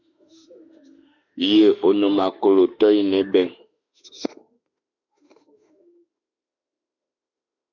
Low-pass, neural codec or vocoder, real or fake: 7.2 kHz; autoencoder, 48 kHz, 32 numbers a frame, DAC-VAE, trained on Japanese speech; fake